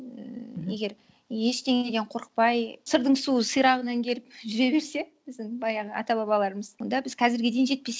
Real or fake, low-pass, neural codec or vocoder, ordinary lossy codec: real; none; none; none